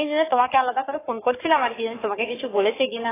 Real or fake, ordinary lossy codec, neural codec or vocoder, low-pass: fake; AAC, 16 kbps; codec, 44.1 kHz, 3.4 kbps, Pupu-Codec; 3.6 kHz